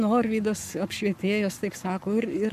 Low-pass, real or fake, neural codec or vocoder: 14.4 kHz; fake; codec, 44.1 kHz, 7.8 kbps, Pupu-Codec